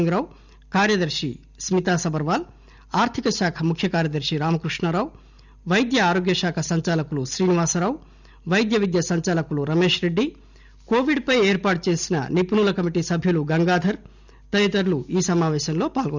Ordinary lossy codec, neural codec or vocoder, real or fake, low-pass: none; none; real; 7.2 kHz